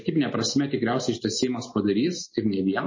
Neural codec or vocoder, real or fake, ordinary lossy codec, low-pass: none; real; MP3, 32 kbps; 7.2 kHz